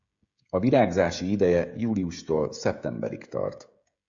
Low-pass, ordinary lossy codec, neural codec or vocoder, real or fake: 7.2 kHz; AAC, 64 kbps; codec, 16 kHz, 16 kbps, FreqCodec, smaller model; fake